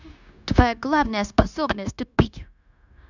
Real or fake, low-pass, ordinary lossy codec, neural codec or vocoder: fake; 7.2 kHz; none; codec, 16 kHz, 0.9 kbps, LongCat-Audio-Codec